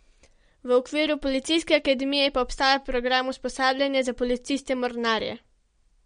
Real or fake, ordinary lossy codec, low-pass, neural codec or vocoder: real; MP3, 48 kbps; 9.9 kHz; none